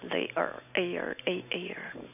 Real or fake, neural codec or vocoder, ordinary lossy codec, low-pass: real; none; none; 3.6 kHz